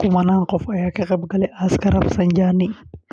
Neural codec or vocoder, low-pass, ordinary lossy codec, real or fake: none; none; none; real